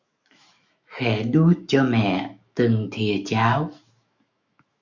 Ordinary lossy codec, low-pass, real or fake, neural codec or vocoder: Opus, 64 kbps; 7.2 kHz; fake; autoencoder, 48 kHz, 128 numbers a frame, DAC-VAE, trained on Japanese speech